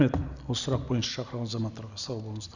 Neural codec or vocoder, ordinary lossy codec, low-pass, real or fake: none; none; 7.2 kHz; real